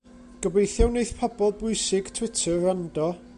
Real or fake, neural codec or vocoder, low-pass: real; none; 10.8 kHz